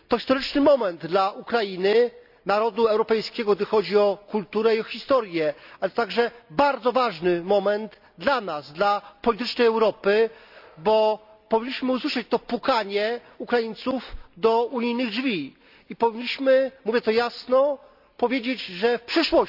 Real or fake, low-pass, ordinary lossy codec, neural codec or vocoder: real; 5.4 kHz; none; none